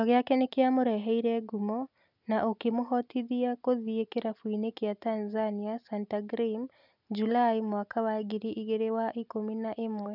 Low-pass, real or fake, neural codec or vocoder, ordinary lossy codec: 5.4 kHz; real; none; none